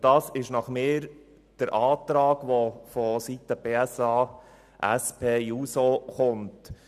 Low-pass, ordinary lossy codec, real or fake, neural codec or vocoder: 14.4 kHz; none; real; none